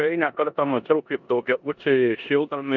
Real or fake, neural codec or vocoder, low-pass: fake; codec, 16 kHz in and 24 kHz out, 0.9 kbps, LongCat-Audio-Codec, four codebook decoder; 7.2 kHz